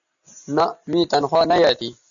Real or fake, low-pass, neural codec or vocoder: real; 7.2 kHz; none